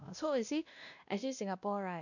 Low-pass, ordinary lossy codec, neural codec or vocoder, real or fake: 7.2 kHz; none; codec, 16 kHz, 1 kbps, X-Codec, WavLM features, trained on Multilingual LibriSpeech; fake